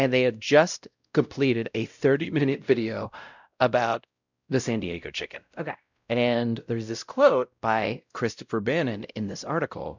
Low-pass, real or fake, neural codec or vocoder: 7.2 kHz; fake; codec, 16 kHz, 0.5 kbps, X-Codec, WavLM features, trained on Multilingual LibriSpeech